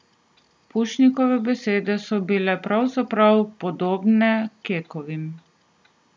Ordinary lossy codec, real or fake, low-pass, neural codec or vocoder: none; real; none; none